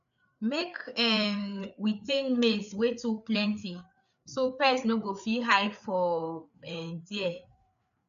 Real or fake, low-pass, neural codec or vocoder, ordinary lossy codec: fake; 7.2 kHz; codec, 16 kHz, 4 kbps, FreqCodec, larger model; none